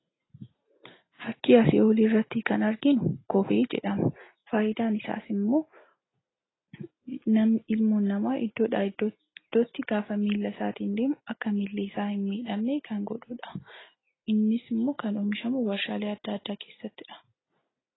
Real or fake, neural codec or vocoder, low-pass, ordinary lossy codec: real; none; 7.2 kHz; AAC, 16 kbps